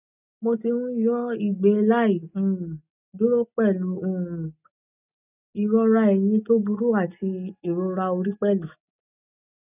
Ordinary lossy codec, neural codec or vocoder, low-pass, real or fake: none; none; 3.6 kHz; real